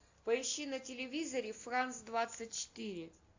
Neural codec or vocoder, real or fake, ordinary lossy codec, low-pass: none; real; AAC, 48 kbps; 7.2 kHz